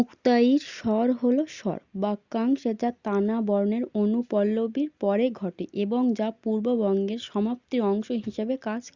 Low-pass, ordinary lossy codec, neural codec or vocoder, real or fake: 7.2 kHz; Opus, 64 kbps; none; real